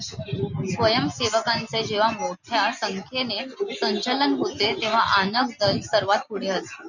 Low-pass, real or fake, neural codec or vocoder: 7.2 kHz; real; none